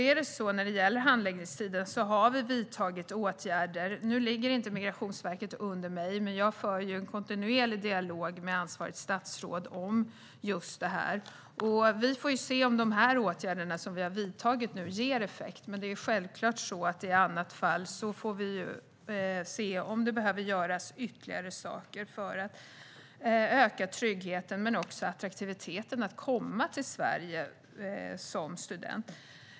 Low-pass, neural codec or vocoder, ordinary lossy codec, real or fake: none; none; none; real